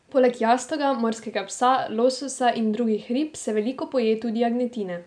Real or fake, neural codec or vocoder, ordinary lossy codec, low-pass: real; none; none; 9.9 kHz